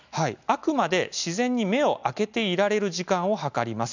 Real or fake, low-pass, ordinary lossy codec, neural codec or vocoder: real; 7.2 kHz; none; none